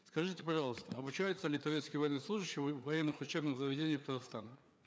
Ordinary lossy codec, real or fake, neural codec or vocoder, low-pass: none; fake; codec, 16 kHz, 4 kbps, FreqCodec, larger model; none